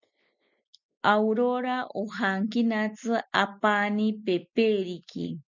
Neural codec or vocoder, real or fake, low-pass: none; real; 7.2 kHz